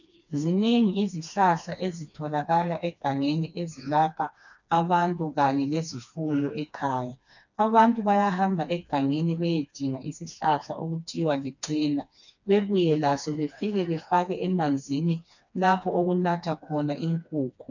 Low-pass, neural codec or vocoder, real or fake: 7.2 kHz; codec, 16 kHz, 2 kbps, FreqCodec, smaller model; fake